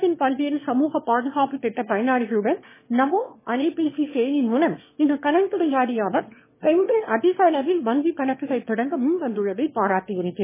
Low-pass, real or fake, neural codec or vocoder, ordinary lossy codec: 3.6 kHz; fake; autoencoder, 22.05 kHz, a latent of 192 numbers a frame, VITS, trained on one speaker; MP3, 16 kbps